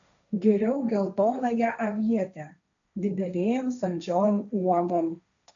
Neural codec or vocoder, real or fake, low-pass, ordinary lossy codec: codec, 16 kHz, 1.1 kbps, Voila-Tokenizer; fake; 7.2 kHz; MP3, 64 kbps